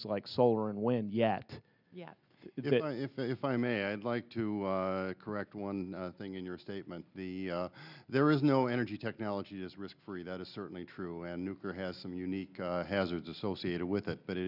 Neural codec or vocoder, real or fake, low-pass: none; real; 5.4 kHz